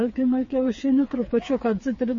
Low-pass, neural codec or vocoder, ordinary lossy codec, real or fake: 7.2 kHz; codec, 16 kHz, 4 kbps, FreqCodec, smaller model; MP3, 32 kbps; fake